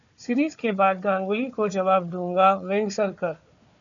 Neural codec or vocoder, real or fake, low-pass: codec, 16 kHz, 4 kbps, FunCodec, trained on Chinese and English, 50 frames a second; fake; 7.2 kHz